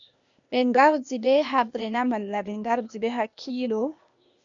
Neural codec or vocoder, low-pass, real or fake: codec, 16 kHz, 0.8 kbps, ZipCodec; 7.2 kHz; fake